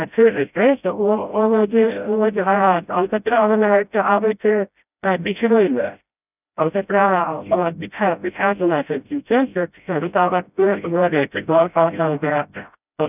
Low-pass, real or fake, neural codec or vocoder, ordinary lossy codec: 3.6 kHz; fake; codec, 16 kHz, 0.5 kbps, FreqCodec, smaller model; none